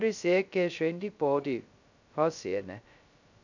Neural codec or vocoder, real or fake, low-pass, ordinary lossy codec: codec, 16 kHz, 0.2 kbps, FocalCodec; fake; 7.2 kHz; none